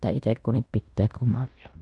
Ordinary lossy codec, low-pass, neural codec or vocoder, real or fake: none; 10.8 kHz; codec, 16 kHz in and 24 kHz out, 0.9 kbps, LongCat-Audio-Codec, fine tuned four codebook decoder; fake